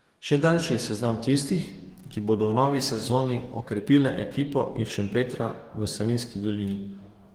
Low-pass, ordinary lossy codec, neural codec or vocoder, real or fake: 19.8 kHz; Opus, 24 kbps; codec, 44.1 kHz, 2.6 kbps, DAC; fake